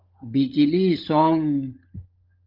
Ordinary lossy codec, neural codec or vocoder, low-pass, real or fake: Opus, 24 kbps; codec, 16 kHz, 16 kbps, FunCodec, trained on LibriTTS, 50 frames a second; 5.4 kHz; fake